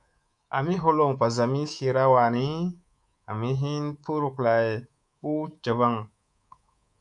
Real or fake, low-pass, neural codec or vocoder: fake; 10.8 kHz; codec, 24 kHz, 3.1 kbps, DualCodec